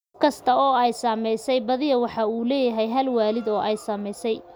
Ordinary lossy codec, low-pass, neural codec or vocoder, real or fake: none; none; none; real